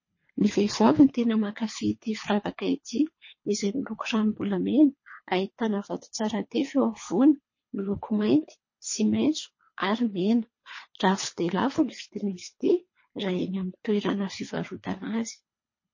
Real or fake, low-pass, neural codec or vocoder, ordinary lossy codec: fake; 7.2 kHz; codec, 24 kHz, 3 kbps, HILCodec; MP3, 32 kbps